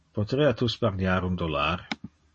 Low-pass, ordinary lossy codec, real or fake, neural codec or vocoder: 10.8 kHz; MP3, 32 kbps; real; none